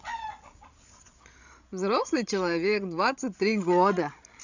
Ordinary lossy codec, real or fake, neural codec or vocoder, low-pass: none; fake; codec, 16 kHz, 16 kbps, FreqCodec, larger model; 7.2 kHz